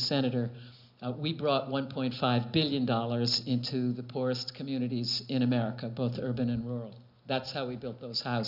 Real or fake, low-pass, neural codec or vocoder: real; 5.4 kHz; none